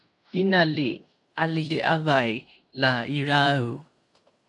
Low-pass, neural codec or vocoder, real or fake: 10.8 kHz; codec, 16 kHz in and 24 kHz out, 0.9 kbps, LongCat-Audio-Codec, fine tuned four codebook decoder; fake